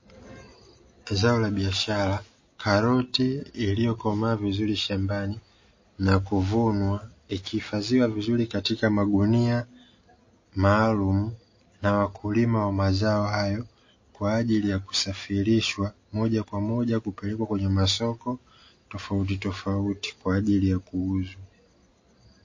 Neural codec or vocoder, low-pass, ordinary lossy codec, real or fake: none; 7.2 kHz; MP3, 32 kbps; real